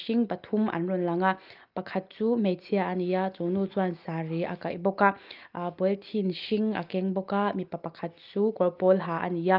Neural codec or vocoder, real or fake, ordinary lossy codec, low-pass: none; real; Opus, 32 kbps; 5.4 kHz